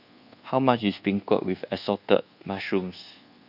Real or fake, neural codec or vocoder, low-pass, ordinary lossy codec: fake; codec, 24 kHz, 1.2 kbps, DualCodec; 5.4 kHz; none